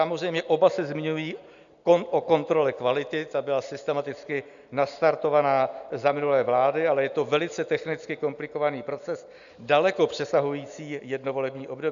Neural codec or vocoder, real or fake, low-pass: none; real; 7.2 kHz